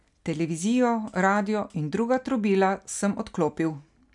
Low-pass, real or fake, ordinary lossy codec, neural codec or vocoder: 10.8 kHz; real; none; none